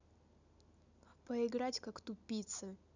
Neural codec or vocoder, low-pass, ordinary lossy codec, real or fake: none; 7.2 kHz; none; real